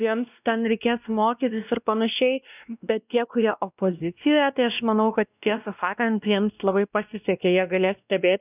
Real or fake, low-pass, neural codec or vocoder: fake; 3.6 kHz; codec, 16 kHz, 1 kbps, X-Codec, WavLM features, trained on Multilingual LibriSpeech